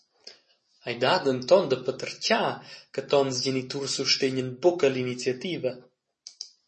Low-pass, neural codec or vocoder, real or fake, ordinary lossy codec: 10.8 kHz; none; real; MP3, 32 kbps